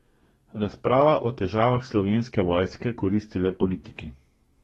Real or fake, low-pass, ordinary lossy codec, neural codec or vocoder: fake; 14.4 kHz; AAC, 32 kbps; codec, 32 kHz, 1.9 kbps, SNAC